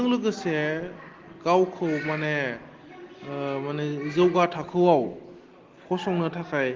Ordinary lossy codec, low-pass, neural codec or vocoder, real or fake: Opus, 16 kbps; 7.2 kHz; none; real